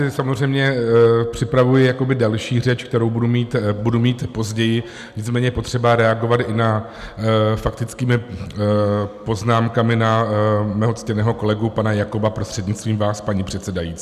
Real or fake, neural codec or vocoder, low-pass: real; none; 14.4 kHz